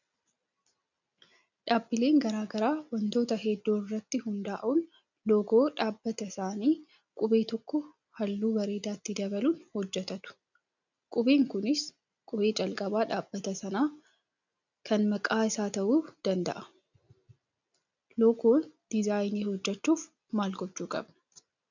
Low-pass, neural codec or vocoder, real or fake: 7.2 kHz; none; real